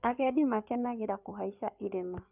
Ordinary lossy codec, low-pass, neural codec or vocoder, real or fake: none; 3.6 kHz; codec, 16 kHz in and 24 kHz out, 2.2 kbps, FireRedTTS-2 codec; fake